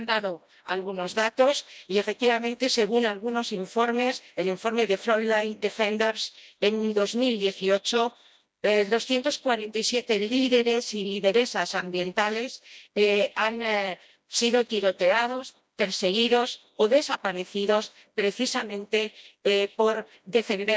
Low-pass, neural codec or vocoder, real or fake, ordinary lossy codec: none; codec, 16 kHz, 1 kbps, FreqCodec, smaller model; fake; none